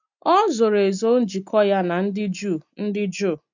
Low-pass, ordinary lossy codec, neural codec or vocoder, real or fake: 7.2 kHz; none; none; real